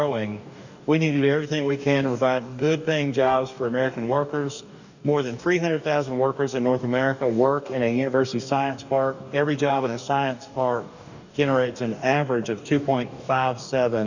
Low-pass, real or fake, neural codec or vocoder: 7.2 kHz; fake; codec, 44.1 kHz, 2.6 kbps, DAC